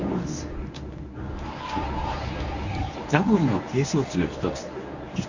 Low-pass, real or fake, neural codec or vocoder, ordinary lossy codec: 7.2 kHz; fake; codec, 24 kHz, 0.9 kbps, WavTokenizer, medium speech release version 1; none